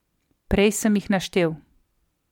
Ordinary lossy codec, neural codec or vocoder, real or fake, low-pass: MP3, 96 kbps; vocoder, 44.1 kHz, 128 mel bands every 256 samples, BigVGAN v2; fake; 19.8 kHz